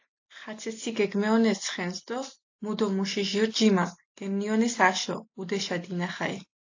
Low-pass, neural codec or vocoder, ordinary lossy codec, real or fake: 7.2 kHz; none; AAC, 48 kbps; real